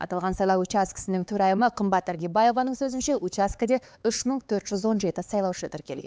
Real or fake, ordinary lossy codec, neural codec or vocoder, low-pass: fake; none; codec, 16 kHz, 4 kbps, X-Codec, HuBERT features, trained on LibriSpeech; none